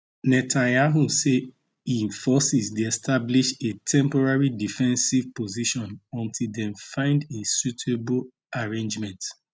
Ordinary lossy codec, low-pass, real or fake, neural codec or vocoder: none; none; real; none